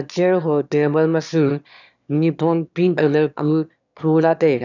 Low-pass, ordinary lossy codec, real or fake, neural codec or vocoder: 7.2 kHz; none; fake; autoencoder, 22.05 kHz, a latent of 192 numbers a frame, VITS, trained on one speaker